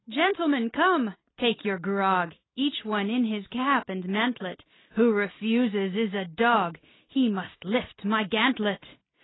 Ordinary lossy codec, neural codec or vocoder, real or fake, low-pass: AAC, 16 kbps; none; real; 7.2 kHz